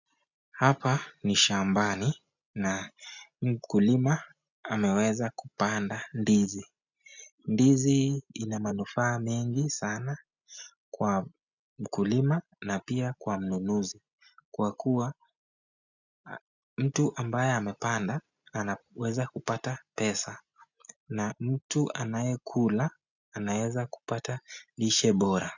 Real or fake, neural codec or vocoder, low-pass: real; none; 7.2 kHz